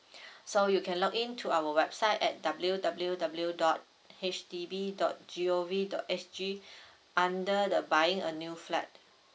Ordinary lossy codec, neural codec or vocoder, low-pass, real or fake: none; none; none; real